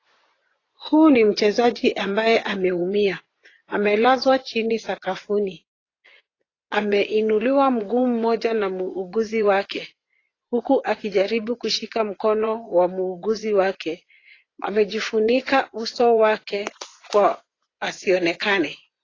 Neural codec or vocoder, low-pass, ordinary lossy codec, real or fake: vocoder, 24 kHz, 100 mel bands, Vocos; 7.2 kHz; AAC, 32 kbps; fake